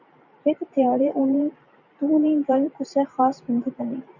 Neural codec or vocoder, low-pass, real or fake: vocoder, 44.1 kHz, 128 mel bands every 512 samples, BigVGAN v2; 7.2 kHz; fake